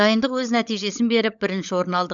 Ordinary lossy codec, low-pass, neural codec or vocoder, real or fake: none; 7.2 kHz; codec, 16 kHz, 16 kbps, FunCodec, trained on LibriTTS, 50 frames a second; fake